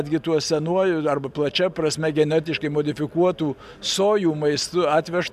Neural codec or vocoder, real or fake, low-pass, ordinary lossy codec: none; real; 14.4 kHz; MP3, 96 kbps